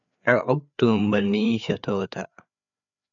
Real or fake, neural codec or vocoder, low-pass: fake; codec, 16 kHz, 4 kbps, FreqCodec, larger model; 7.2 kHz